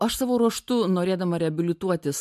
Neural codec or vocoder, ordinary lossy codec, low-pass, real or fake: none; MP3, 96 kbps; 14.4 kHz; real